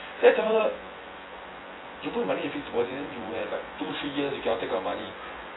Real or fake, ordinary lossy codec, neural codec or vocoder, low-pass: fake; AAC, 16 kbps; vocoder, 24 kHz, 100 mel bands, Vocos; 7.2 kHz